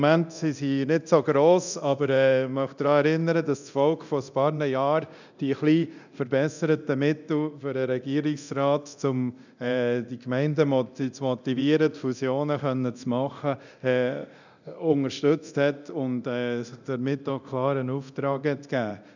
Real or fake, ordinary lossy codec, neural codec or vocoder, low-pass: fake; none; codec, 24 kHz, 0.9 kbps, DualCodec; 7.2 kHz